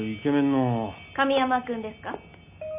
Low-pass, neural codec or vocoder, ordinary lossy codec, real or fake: 3.6 kHz; none; none; real